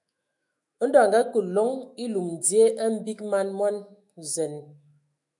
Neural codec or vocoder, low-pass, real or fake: autoencoder, 48 kHz, 128 numbers a frame, DAC-VAE, trained on Japanese speech; 10.8 kHz; fake